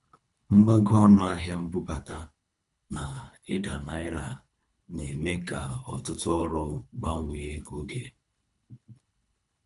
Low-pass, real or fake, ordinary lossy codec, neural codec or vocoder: 10.8 kHz; fake; none; codec, 24 kHz, 3 kbps, HILCodec